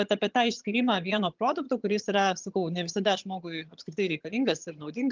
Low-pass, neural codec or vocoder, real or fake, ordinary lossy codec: 7.2 kHz; vocoder, 22.05 kHz, 80 mel bands, HiFi-GAN; fake; Opus, 24 kbps